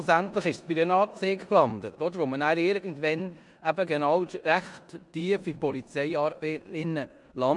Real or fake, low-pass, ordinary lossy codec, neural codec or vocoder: fake; 10.8 kHz; MP3, 64 kbps; codec, 16 kHz in and 24 kHz out, 0.9 kbps, LongCat-Audio-Codec, four codebook decoder